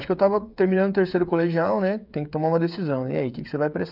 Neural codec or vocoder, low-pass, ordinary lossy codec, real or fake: codec, 16 kHz, 8 kbps, FreqCodec, smaller model; 5.4 kHz; none; fake